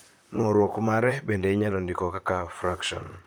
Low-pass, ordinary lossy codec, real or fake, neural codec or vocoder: none; none; fake; vocoder, 44.1 kHz, 128 mel bands, Pupu-Vocoder